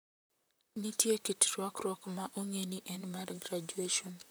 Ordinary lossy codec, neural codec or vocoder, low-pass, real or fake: none; vocoder, 44.1 kHz, 128 mel bands, Pupu-Vocoder; none; fake